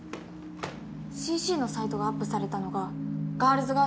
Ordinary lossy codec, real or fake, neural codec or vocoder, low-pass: none; real; none; none